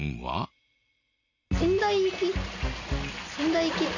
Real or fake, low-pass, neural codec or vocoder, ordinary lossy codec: real; 7.2 kHz; none; none